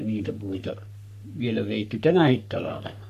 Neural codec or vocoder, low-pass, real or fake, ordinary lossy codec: codec, 44.1 kHz, 3.4 kbps, Pupu-Codec; 14.4 kHz; fake; none